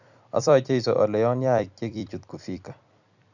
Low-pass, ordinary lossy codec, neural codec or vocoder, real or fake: 7.2 kHz; none; vocoder, 44.1 kHz, 128 mel bands every 256 samples, BigVGAN v2; fake